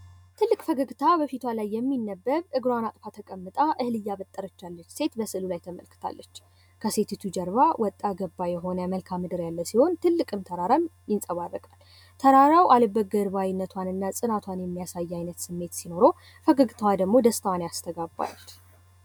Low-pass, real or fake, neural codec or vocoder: 19.8 kHz; real; none